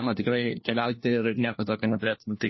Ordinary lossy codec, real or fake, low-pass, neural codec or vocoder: MP3, 24 kbps; fake; 7.2 kHz; codec, 16 kHz, 1 kbps, FunCodec, trained on LibriTTS, 50 frames a second